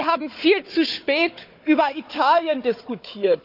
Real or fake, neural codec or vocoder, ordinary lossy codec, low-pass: fake; codec, 16 kHz, 4 kbps, FunCodec, trained on Chinese and English, 50 frames a second; none; 5.4 kHz